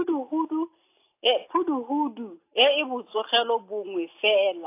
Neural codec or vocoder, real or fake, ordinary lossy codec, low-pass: none; real; AAC, 24 kbps; 3.6 kHz